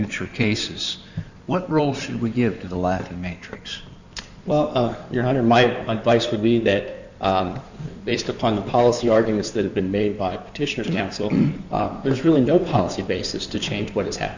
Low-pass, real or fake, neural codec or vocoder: 7.2 kHz; fake; codec, 16 kHz in and 24 kHz out, 2.2 kbps, FireRedTTS-2 codec